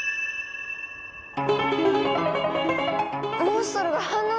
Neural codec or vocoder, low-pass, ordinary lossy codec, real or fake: none; none; none; real